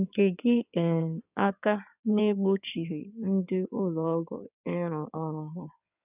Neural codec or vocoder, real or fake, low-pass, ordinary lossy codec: codec, 16 kHz in and 24 kHz out, 2.2 kbps, FireRedTTS-2 codec; fake; 3.6 kHz; none